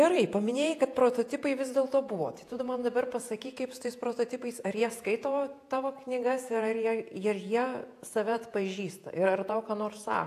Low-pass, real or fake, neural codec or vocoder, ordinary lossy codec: 14.4 kHz; fake; vocoder, 48 kHz, 128 mel bands, Vocos; AAC, 64 kbps